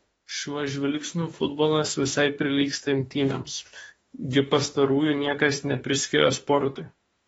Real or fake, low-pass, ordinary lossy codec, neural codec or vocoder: fake; 19.8 kHz; AAC, 24 kbps; autoencoder, 48 kHz, 32 numbers a frame, DAC-VAE, trained on Japanese speech